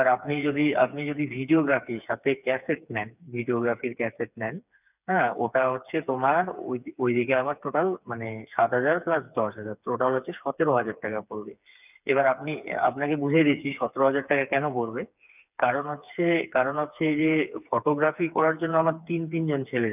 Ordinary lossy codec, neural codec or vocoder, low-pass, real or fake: none; codec, 16 kHz, 4 kbps, FreqCodec, smaller model; 3.6 kHz; fake